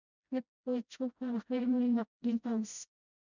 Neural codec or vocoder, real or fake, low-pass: codec, 16 kHz, 0.5 kbps, FreqCodec, smaller model; fake; 7.2 kHz